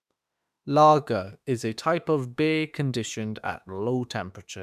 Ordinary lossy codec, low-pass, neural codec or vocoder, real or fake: none; 14.4 kHz; autoencoder, 48 kHz, 32 numbers a frame, DAC-VAE, trained on Japanese speech; fake